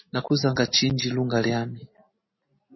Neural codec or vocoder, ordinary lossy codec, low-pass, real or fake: none; MP3, 24 kbps; 7.2 kHz; real